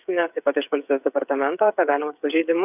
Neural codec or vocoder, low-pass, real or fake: codec, 16 kHz, 8 kbps, FreqCodec, smaller model; 3.6 kHz; fake